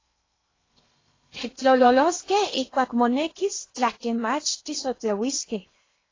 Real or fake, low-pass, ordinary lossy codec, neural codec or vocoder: fake; 7.2 kHz; AAC, 32 kbps; codec, 16 kHz in and 24 kHz out, 0.8 kbps, FocalCodec, streaming, 65536 codes